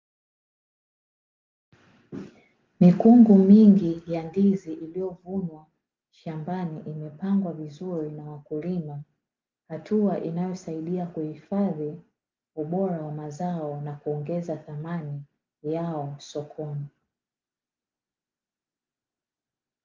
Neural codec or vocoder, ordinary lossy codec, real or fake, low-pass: none; Opus, 32 kbps; real; 7.2 kHz